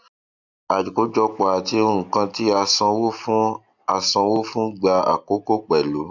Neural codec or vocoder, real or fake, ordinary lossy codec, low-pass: none; real; none; 7.2 kHz